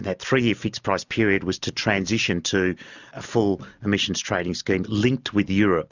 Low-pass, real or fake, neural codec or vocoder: 7.2 kHz; real; none